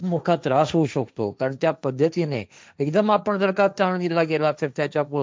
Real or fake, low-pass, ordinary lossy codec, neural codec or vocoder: fake; none; none; codec, 16 kHz, 1.1 kbps, Voila-Tokenizer